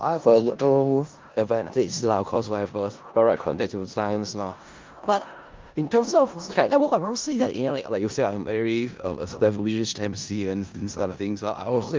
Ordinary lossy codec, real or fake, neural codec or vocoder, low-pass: Opus, 32 kbps; fake; codec, 16 kHz in and 24 kHz out, 0.4 kbps, LongCat-Audio-Codec, four codebook decoder; 7.2 kHz